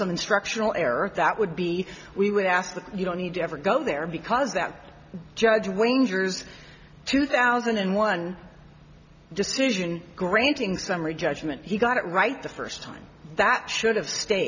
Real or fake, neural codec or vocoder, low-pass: real; none; 7.2 kHz